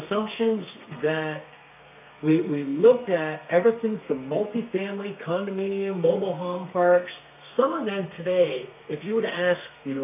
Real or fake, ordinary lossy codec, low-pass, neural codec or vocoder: fake; AAC, 32 kbps; 3.6 kHz; codec, 32 kHz, 1.9 kbps, SNAC